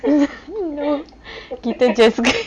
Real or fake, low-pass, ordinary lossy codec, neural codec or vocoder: real; 9.9 kHz; none; none